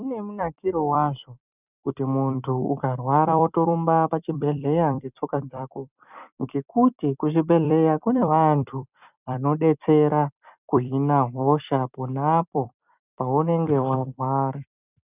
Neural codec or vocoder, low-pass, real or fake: vocoder, 44.1 kHz, 128 mel bands every 256 samples, BigVGAN v2; 3.6 kHz; fake